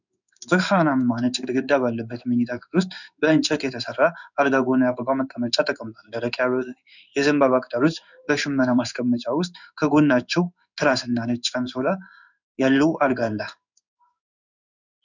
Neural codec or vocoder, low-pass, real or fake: codec, 16 kHz in and 24 kHz out, 1 kbps, XY-Tokenizer; 7.2 kHz; fake